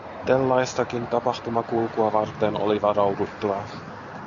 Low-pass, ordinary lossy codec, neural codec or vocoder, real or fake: 7.2 kHz; MP3, 96 kbps; none; real